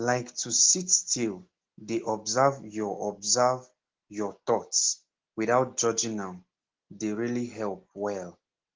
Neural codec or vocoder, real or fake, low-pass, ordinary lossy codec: none; real; 7.2 kHz; Opus, 16 kbps